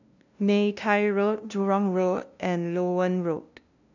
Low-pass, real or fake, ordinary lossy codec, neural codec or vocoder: 7.2 kHz; fake; none; codec, 16 kHz, 0.5 kbps, FunCodec, trained on LibriTTS, 25 frames a second